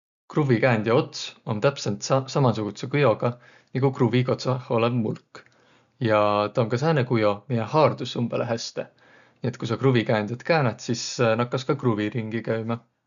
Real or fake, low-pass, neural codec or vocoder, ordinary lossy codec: real; 7.2 kHz; none; none